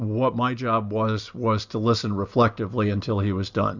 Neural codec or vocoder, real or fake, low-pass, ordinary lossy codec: none; real; 7.2 kHz; Opus, 64 kbps